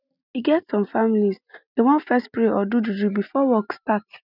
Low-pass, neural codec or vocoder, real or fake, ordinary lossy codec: 5.4 kHz; none; real; none